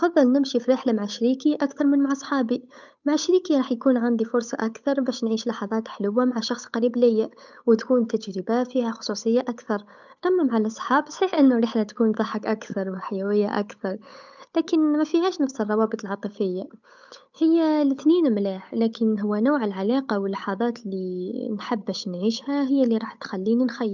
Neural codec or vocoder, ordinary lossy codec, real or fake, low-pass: codec, 16 kHz, 8 kbps, FunCodec, trained on Chinese and English, 25 frames a second; none; fake; 7.2 kHz